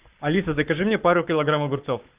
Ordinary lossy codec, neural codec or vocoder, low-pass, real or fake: Opus, 32 kbps; codec, 44.1 kHz, 7.8 kbps, Pupu-Codec; 3.6 kHz; fake